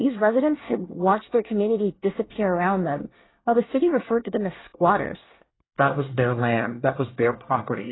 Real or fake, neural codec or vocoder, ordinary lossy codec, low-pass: fake; codec, 24 kHz, 1 kbps, SNAC; AAC, 16 kbps; 7.2 kHz